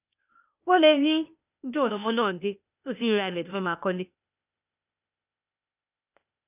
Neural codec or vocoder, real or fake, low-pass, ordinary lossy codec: codec, 16 kHz, 0.8 kbps, ZipCodec; fake; 3.6 kHz; none